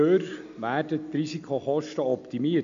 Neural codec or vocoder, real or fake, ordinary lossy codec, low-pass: none; real; AAC, 64 kbps; 7.2 kHz